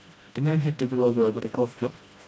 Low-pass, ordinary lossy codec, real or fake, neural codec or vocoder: none; none; fake; codec, 16 kHz, 1 kbps, FreqCodec, smaller model